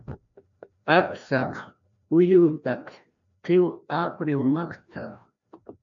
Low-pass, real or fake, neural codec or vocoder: 7.2 kHz; fake; codec, 16 kHz, 1 kbps, FreqCodec, larger model